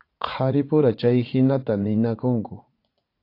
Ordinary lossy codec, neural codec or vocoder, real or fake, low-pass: AAC, 32 kbps; vocoder, 44.1 kHz, 80 mel bands, Vocos; fake; 5.4 kHz